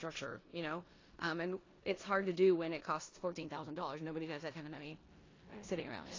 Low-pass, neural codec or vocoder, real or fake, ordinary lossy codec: 7.2 kHz; codec, 16 kHz in and 24 kHz out, 0.9 kbps, LongCat-Audio-Codec, four codebook decoder; fake; AAC, 32 kbps